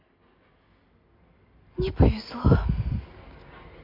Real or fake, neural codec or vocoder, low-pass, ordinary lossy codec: real; none; 5.4 kHz; AAC, 32 kbps